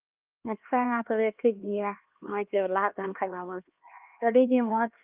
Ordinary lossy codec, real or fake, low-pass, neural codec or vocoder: Opus, 24 kbps; fake; 3.6 kHz; codec, 16 kHz, 2 kbps, X-Codec, HuBERT features, trained on LibriSpeech